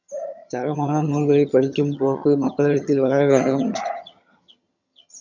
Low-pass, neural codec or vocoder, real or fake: 7.2 kHz; vocoder, 22.05 kHz, 80 mel bands, HiFi-GAN; fake